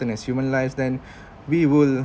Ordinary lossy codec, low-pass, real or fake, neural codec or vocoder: none; none; real; none